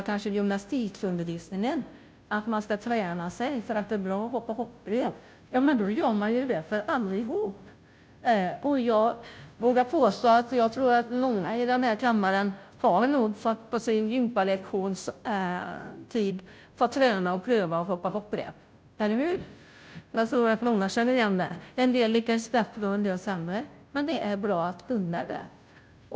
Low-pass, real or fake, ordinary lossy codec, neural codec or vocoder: none; fake; none; codec, 16 kHz, 0.5 kbps, FunCodec, trained on Chinese and English, 25 frames a second